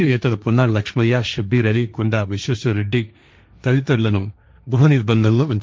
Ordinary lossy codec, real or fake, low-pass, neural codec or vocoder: none; fake; none; codec, 16 kHz, 1.1 kbps, Voila-Tokenizer